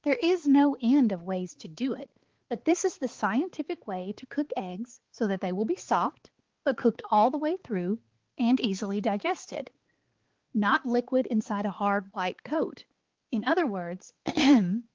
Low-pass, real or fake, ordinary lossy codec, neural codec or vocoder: 7.2 kHz; fake; Opus, 16 kbps; codec, 16 kHz, 4 kbps, X-Codec, HuBERT features, trained on balanced general audio